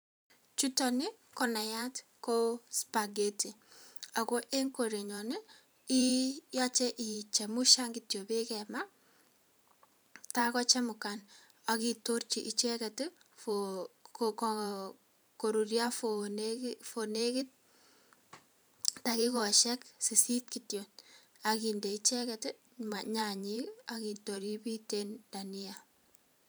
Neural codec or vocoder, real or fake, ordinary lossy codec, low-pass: vocoder, 44.1 kHz, 128 mel bands every 512 samples, BigVGAN v2; fake; none; none